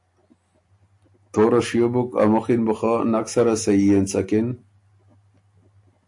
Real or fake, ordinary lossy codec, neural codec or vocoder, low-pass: real; AAC, 64 kbps; none; 10.8 kHz